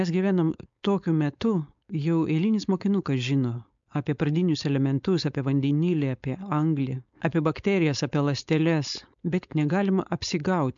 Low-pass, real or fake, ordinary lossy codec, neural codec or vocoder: 7.2 kHz; fake; MP3, 64 kbps; codec, 16 kHz, 4.8 kbps, FACodec